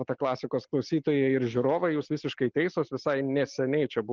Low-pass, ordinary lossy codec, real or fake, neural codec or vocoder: 7.2 kHz; Opus, 24 kbps; real; none